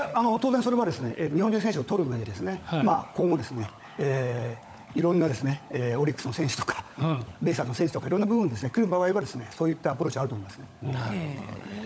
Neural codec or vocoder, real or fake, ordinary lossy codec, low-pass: codec, 16 kHz, 16 kbps, FunCodec, trained on LibriTTS, 50 frames a second; fake; none; none